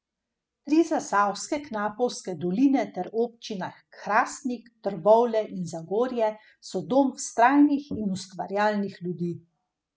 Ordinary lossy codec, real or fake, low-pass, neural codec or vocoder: none; real; none; none